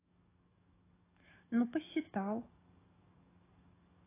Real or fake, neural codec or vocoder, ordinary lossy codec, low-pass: fake; codec, 44.1 kHz, 7.8 kbps, DAC; AAC, 16 kbps; 3.6 kHz